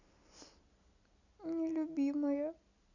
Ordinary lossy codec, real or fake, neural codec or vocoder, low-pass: none; real; none; 7.2 kHz